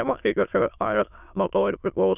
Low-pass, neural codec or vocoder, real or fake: 3.6 kHz; autoencoder, 22.05 kHz, a latent of 192 numbers a frame, VITS, trained on many speakers; fake